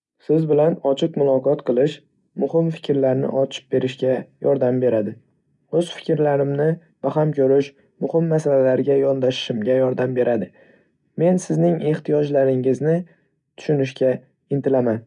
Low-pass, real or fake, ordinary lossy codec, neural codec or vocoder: 10.8 kHz; real; none; none